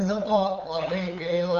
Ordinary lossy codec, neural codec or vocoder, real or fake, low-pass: MP3, 48 kbps; codec, 16 kHz, 8 kbps, FunCodec, trained on LibriTTS, 25 frames a second; fake; 7.2 kHz